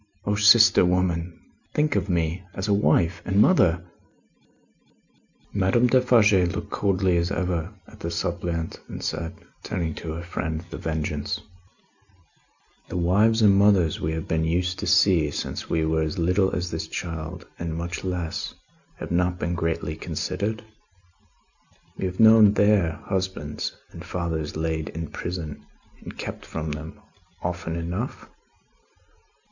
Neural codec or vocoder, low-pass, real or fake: none; 7.2 kHz; real